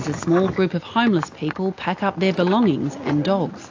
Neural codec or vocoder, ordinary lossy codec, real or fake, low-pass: none; AAC, 48 kbps; real; 7.2 kHz